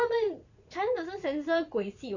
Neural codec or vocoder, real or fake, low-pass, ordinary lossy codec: vocoder, 44.1 kHz, 80 mel bands, Vocos; fake; 7.2 kHz; none